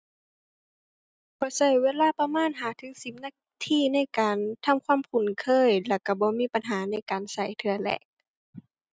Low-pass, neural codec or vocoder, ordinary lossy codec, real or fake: none; none; none; real